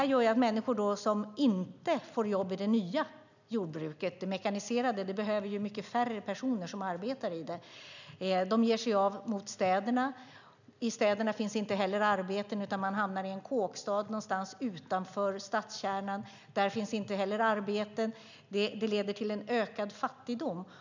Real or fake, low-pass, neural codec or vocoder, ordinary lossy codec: real; 7.2 kHz; none; none